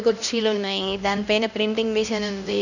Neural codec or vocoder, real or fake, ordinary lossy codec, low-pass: codec, 16 kHz, 1 kbps, X-Codec, HuBERT features, trained on LibriSpeech; fake; none; 7.2 kHz